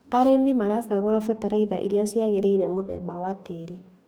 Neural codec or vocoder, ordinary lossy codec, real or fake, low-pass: codec, 44.1 kHz, 2.6 kbps, DAC; none; fake; none